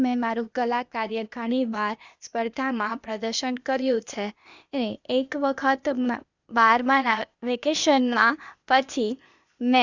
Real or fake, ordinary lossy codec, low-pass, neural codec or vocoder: fake; Opus, 64 kbps; 7.2 kHz; codec, 16 kHz, 0.8 kbps, ZipCodec